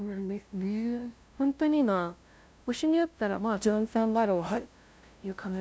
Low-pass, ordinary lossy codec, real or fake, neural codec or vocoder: none; none; fake; codec, 16 kHz, 0.5 kbps, FunCodec, trained on LibriTTS, 25 frames a second